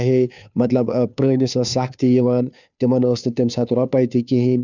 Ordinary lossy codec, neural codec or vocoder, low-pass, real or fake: none; codec, 16 kHz, 2 kbps, FunCodec, trained on Chinese and English, 25 frames a second; 7.2 kHz; fake